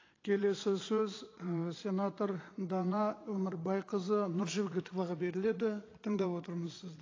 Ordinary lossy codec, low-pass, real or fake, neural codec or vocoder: AAC, 32 kbps; 7.2 kHz; fake; vocoder, 22.05 kHz, 80 mel bands, Vocos